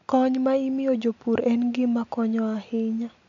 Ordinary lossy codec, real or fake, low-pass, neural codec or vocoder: MP3, 64 kbps; real; 7.2 kHz; none